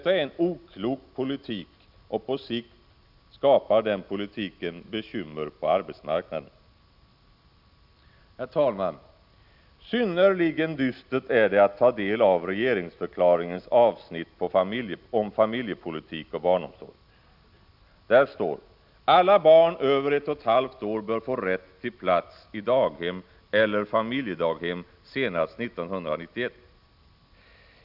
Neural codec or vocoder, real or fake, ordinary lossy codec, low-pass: none; real; none; 5.4 kHz